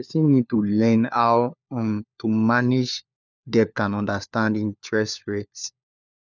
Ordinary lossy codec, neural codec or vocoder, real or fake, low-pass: none; codec, 16 kHz, 4 kbps, FunCodec, trained on LibriTTS, 50 frames a second; fake; 7.2 kHz